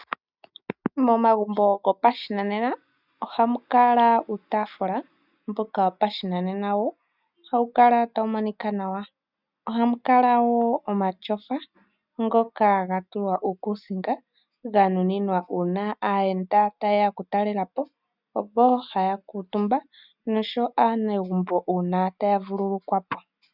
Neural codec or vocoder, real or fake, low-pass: none; real; 5.4 kHz